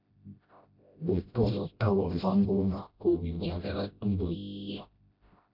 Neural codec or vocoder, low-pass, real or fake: codec, 16 kHz, 0.5 kbps, FreqCodec, smaller model; 5.4 kHz; fake